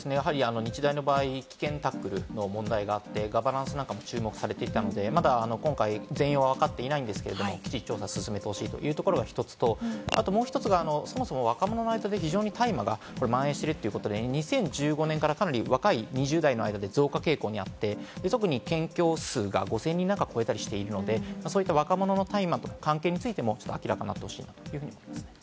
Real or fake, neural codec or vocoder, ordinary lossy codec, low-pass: real; none; none; none